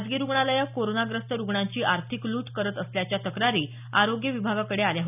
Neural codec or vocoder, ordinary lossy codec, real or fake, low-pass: none; none; real; 3.6 kHz